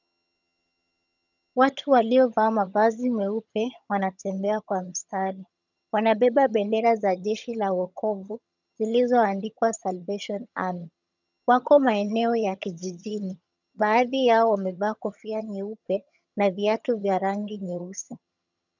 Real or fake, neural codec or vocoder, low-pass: fake; vocoder, 22.05 kHz, 80 mel bands, HiFi-GAN; 7.2 kHz